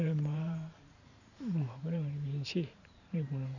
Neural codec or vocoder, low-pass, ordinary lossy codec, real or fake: vocoder, 44.1 kHz, 128 mel bands every 512 samples, BigVGAN v2; 7.2 kHz; none; fake